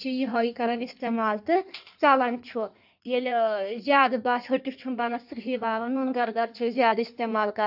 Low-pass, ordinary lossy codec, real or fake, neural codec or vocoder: 5.4 kHz; AAC, 48 kbps; fake; codec, 16 kHz in and 24 kHz out, 1.1 kbps, FireRedTTS-2 codec